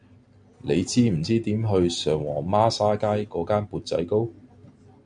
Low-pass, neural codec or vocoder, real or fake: 9.9 kHz; none; real